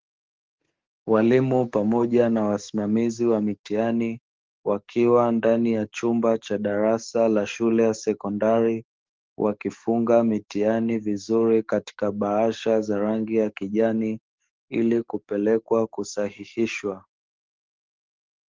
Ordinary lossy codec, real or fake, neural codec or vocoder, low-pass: Opus, 16 kbps; fake; codec, 44.1 kHz, 7.8 kbps, DAC; 7.2 kHz